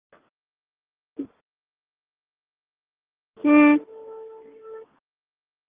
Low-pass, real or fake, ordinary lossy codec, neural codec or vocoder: 3.6 kHz; real; Opus, 32 kbps; none